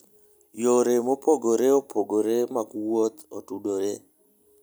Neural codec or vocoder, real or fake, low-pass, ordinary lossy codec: none; real; none; none